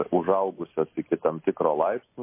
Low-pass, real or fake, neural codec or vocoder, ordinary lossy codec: 3.6 kHz; real; none; MP3, 24 kbps